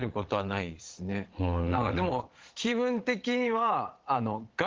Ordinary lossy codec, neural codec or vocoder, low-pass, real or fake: Opus, 16 kbps; vocoder, 22.05 kHz, 80 mel bands, Vocos; 7.2 kHz; fake